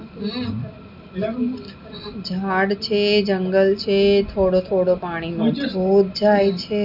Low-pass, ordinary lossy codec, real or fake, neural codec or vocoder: 5.4 kHz; none; real; none